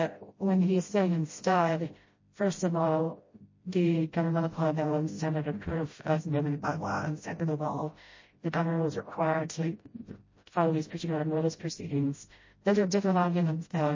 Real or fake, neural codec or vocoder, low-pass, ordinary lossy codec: fake; codec, 16 kHz, 0.5 kbps, FreqCodec, smaller model; 7.2 kHz; MP3, 32 kbps